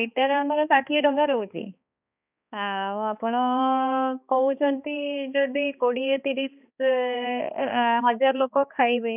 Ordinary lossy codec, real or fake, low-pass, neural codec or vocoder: none; fake; 3.6 kHz; codec, 16 kHz, 2 kbps, X-Codec, HuBERT features, trained on balanced general audio